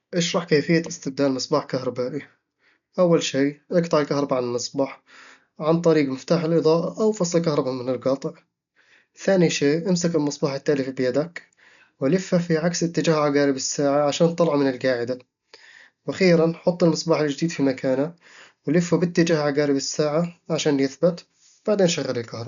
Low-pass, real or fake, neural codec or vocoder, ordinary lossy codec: 7.2 kHz; real; none; none